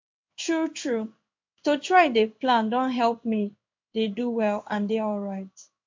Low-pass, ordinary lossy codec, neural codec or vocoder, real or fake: 7.2 kHz; MP3, 48 kbps; codec, 16 kHz in and 24 kHz out, 1 kbps, XY-Tokenizer; fake